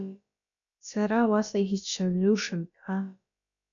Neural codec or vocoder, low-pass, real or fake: codec, 16 kHz, about 1 kbps, DyCAST, with the encoder's durations; 7.2 kHz; fake